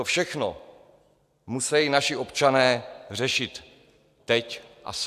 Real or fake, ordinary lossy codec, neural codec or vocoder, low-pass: real; AAC, 96 kbps; none; 14.4 kHz